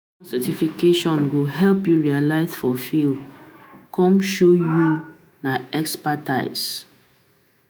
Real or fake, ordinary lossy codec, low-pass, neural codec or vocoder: fake; none; none; autoencoder, 48 kHz, 128 numbers a frame, DAC-VAE, trained on Japanese speech